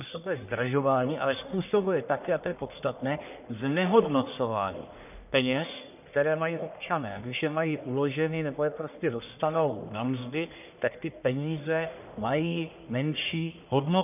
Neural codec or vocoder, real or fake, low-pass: codec, 44.1 kHz, 1.7 kbps, Pupu-Codec; fake; 3.6 kHz